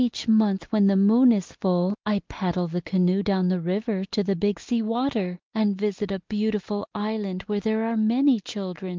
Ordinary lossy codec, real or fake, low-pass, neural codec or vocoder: Opus, 32 kbps; real; 7.2 kHz; none